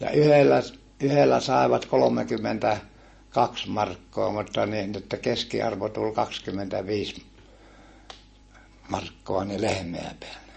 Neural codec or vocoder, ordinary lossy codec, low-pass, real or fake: none; MP3, 32 kbps; 10.8 kHz; real